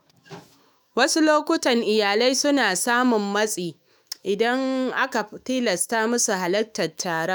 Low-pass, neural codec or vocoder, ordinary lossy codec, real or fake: none; autoencoder, 48 kHz, 128 numbers a frame, DAC-VAE, trained on Japanese speech; none; fake